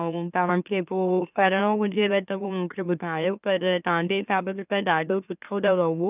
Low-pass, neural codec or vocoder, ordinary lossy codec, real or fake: 3.6 kHz; autoencoder, 44.1 kHz, a latent of 192 numbers a frame, MeloTTS; none; fake